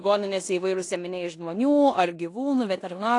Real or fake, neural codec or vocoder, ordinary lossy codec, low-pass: fake; codec, 16 kHz in and 24 kHz out, 0.9 kbps, LongCat-Audio-Codec, four codebook decoder; AAC, 48 kbps; 10.8 kHz